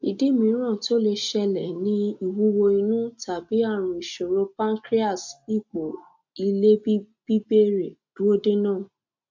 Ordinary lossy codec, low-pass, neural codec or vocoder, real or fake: MP3, 64 kbps; 7.2 kHz; none; real